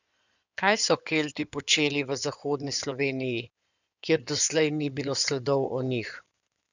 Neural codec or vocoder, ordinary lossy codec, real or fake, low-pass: codec, 16 kHz in and 24 kHz out, 2.2 kbps, FireRedTTS-2 codec; none; fake; 7.2 kHz